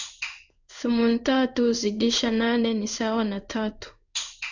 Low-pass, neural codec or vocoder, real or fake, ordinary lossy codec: 7.2 kHz; codec, 16 kHz in and 24 kHz out, 1 kbps, XY-Tokenizer; fake; none